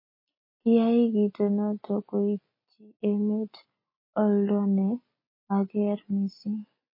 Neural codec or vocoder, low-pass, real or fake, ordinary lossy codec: none; 5.4 kHz; real; MP3, 24 kbps